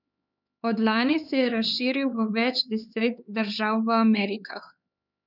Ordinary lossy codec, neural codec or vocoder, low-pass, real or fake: none; codec, 16 kHz, 4 kbps, X-Codec, HuBERT features, trained on LibriSpeech; 5.4 kHz; fake